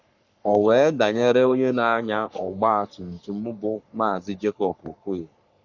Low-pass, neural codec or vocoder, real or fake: 7.2 kHz; codec, 44.1 kHz, 3.4 kbps, Pupu-Codec; fake